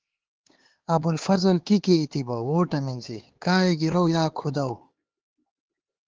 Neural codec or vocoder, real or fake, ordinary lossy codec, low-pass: codec, 16 kHz, 4 kbps, X-Codec, HuBERT features, trained on LibriSpeech; fake; Opus, 16 kbps; 7.2 kHz